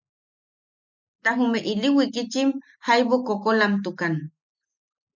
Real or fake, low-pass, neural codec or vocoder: real; 7.2 kHz; none